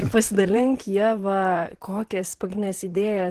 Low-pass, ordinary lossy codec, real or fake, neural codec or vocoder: 14.4 kHz; Opus, 16 kbps; fake; vocoder, 44.1 kHz, 128 mel bands, Pupu-Vocoder